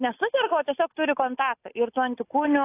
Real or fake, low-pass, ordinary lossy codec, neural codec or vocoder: real; 3.6 kHz; AAC, 24 kbps; none